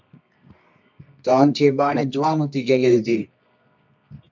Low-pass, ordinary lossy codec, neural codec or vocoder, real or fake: 7.2 kHz; MP3, 64 kbps; codec, 24 kHz, 0.9 kbps, WavTokenizer, medium music audio release; fake